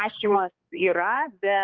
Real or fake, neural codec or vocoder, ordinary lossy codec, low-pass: fake; codec, 16 kHz, 2 kbps, X-Codec, HuBERT features, trained on balanced general audio; Opus, 32 kbps; 7.2 kHz